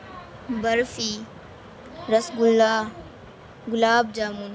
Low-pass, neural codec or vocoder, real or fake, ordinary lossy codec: none; none; real; none